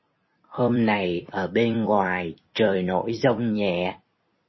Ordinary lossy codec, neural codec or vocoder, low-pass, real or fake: MP3, 24 kbps; vocoder, 44.1 kHz, 128 mel bands every 256 samples, BigVGAN v2; 7.2 kHz; fake